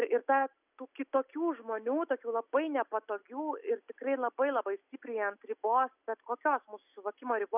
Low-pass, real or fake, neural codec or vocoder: 3.6 kHz; real; none